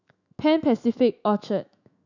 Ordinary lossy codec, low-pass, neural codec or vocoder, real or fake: none; 7.2 kHz; autoencoder, 48 kHz, 128 numbers a frame, DAC-VAE, trained on Japanese speech; fake